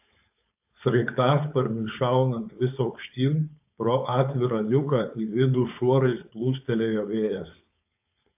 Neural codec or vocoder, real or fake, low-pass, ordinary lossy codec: codec, 16 kHz, 4.8 kbps, FACodec; fake; 3.6 kHz; AAC, 32 kbps